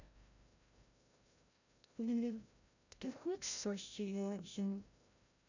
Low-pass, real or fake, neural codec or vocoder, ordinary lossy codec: 7.2 kHz; fake; codec, 16 kHz, 0.5 kbps, FreqCodec, larger model; none